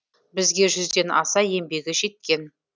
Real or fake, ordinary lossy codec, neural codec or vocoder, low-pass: real; none; none; none